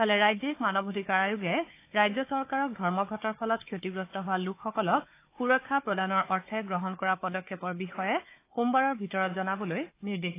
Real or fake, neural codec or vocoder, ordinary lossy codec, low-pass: fake; codec, 16 kHz, 4 kbps, FunCodec, trained on Chinese and English, 50 frames a second; AAC, 24 kbps; 3.6 kHz